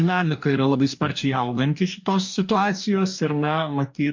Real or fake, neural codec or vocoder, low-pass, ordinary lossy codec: fake; codec, 44.1 kHz, 2.6 kbps, DAC; 7.2 kHz; MP3, 48 kbps